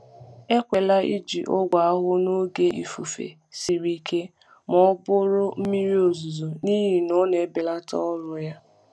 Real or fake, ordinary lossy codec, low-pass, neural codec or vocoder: real; none; none; none